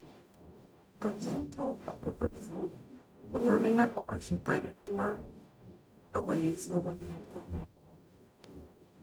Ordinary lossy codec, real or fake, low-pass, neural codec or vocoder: none; fake; none; codec, 44.1 kHz, 0.9 kbps, DAC